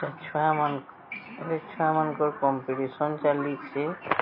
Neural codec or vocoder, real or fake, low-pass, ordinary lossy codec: none; real; 5.4 kHz; MP3, 24 kbps